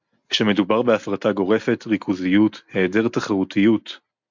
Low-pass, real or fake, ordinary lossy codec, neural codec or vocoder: 7.2 kHz; real; MP3, 64 kbps; none